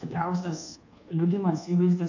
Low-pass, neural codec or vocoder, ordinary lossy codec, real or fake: 7.2 kHz; codec, 24 kHz, 1.2 kbps, DualCodec; AAC, 48 kbps; fake